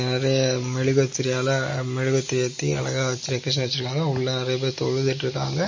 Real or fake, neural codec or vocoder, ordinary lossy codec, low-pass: real; none; MP3, 32 kbps; 7.2 kHz